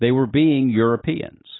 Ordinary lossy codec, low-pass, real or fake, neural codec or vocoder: AAC, 16 kbps; 7.2 kHz; real; none